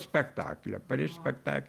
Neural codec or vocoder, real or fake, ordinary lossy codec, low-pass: vocoder, 48 kHz, 128 mel bands, Vocos; fake; Opus, 32 kbps; 14.4 kHz